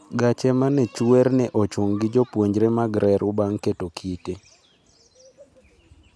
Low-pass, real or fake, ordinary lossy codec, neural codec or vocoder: none; real; none; none